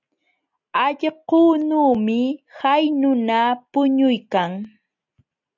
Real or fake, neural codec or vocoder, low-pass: real; none; 7.2 kHz